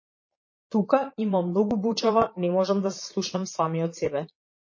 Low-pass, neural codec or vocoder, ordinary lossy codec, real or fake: 7.2 kHz; vocoder, 22.05 kHz, 80 mel bands, Vocos; MP3, 32 kbps; fake